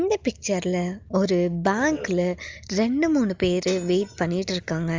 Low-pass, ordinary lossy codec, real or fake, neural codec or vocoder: 7.2 kHz; Opus, 32 kbps; real; none